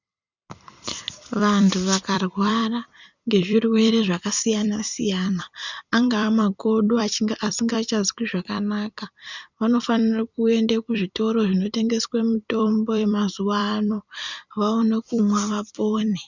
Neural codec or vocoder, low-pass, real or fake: vocoder, 44.1 kHz, 128 mel bands every 256 samples, BigVGAN v2; 7.2 kHz; fake